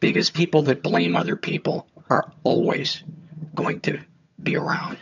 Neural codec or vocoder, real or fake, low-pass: vocoder, 22.05 kHz, 80 mel bands, HiFi-GAN; fake; 7.2 kHz